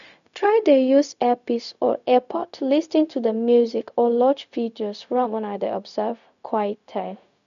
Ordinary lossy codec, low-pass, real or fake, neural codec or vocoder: none; 7.2 kHz; fake; codec, 16 kHz, 0.4 kbps, LongCat-Audio-Codec